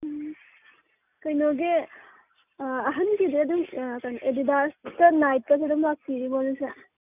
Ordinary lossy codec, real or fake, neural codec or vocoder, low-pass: none; real; none; 3.6 kHz